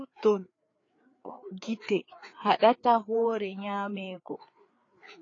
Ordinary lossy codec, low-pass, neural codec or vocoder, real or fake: AAC, 32 kbps; 7.2 kHz; codec, 16 kHz, 4 kbps, FreqCodec, larger model; fake